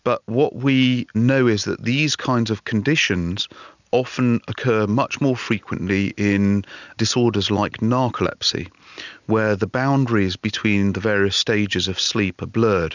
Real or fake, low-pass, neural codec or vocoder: real; 7.2 kHz; none